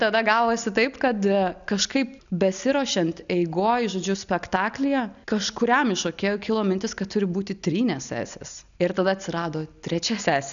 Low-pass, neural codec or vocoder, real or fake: 7.2 kHz; none; real